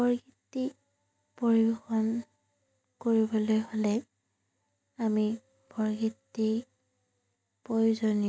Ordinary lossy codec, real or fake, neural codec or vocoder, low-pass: none; real; none; none